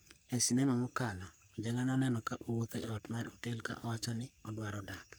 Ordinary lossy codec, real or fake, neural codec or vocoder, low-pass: none; fake; codec, 44.1 kHz, 3.4 kbps, Pupu-Codec; none